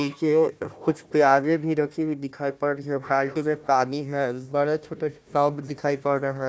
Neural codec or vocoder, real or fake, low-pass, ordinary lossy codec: codec, 16 kHz, 1 kbps, FunCodec, trained on Chinese and English, 50 frames a second; fake; none; none